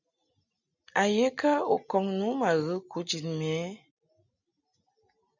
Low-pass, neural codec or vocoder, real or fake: 7.2 kHz; none; real